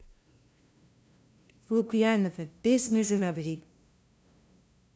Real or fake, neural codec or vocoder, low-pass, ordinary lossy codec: fake; codec, 16 kHz, 0.5 kbps, FunCodec, trained on LibriTTS, 25 frames a second; none; none